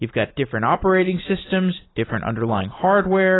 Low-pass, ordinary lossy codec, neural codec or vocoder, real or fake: 7.2 kHz; AAC, 16 kbps; none; real